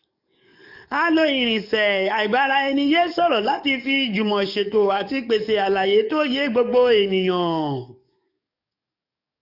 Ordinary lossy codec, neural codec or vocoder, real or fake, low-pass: none; codec, 44.1 kHz, 7.8 kbps, DAC; fake; 5.4 kHz